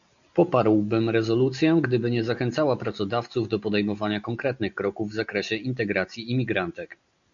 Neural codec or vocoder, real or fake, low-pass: none; real; 7.2 kHz